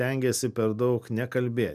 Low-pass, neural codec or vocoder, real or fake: 14.4 kHz; none; real